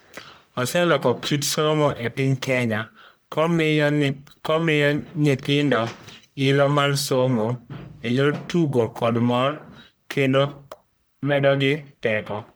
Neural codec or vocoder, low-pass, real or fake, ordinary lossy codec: codec, 44.1 kHz, 1.7 kbps, Pupu-Codec; none; fake; none